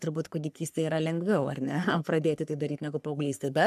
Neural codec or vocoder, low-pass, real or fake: codec, 44.1 kHz, 3.4 kbps, Pupu-Codec; 14.4 kHz; fake